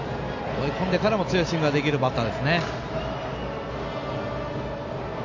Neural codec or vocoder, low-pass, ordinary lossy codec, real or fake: none; 7.2 kHz; AAC, 48 kbps; real